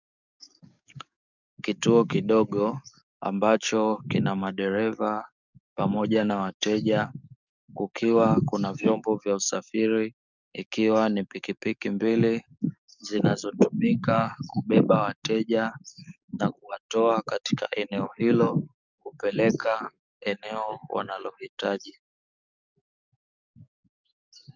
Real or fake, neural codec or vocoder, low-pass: fake; codec, 44.1 kHz, 7.8 kbps, DAC; 7.2 kHz